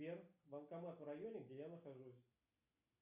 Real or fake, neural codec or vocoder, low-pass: real; none; 3.6 kHz